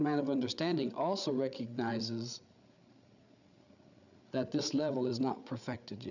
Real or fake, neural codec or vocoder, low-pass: fake; codec, 16 kHz, 8 kbps, FreqCodec, larger model; 7.2 kHz